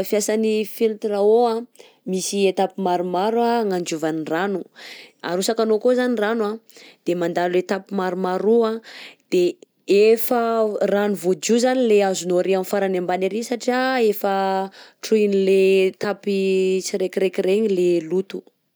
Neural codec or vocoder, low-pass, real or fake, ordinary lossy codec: none; none; real; none